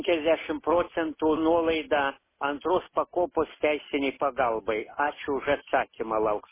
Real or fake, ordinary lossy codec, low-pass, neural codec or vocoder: real; MP3, 16 kbps; 3.6 kHz; none